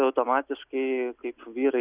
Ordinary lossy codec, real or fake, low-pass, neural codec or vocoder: Opus, 64 kbps; real; 3.6 kHz; none